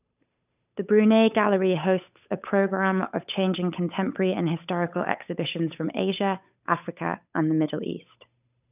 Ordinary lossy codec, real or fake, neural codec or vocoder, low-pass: none; fake; codec, 16 kHz, 8 kbps, FunCodec, trained on Chinese and English, 25 frames a second; 3.6 kHz